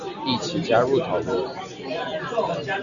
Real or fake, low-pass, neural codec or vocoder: real; 7.2 kHz; none